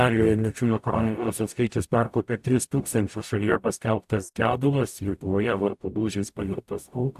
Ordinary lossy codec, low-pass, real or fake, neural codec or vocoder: AAC, 96 kbps; 14.4 kHz; fake; codec, 44.1 kHz, 0.9 kbps, DAC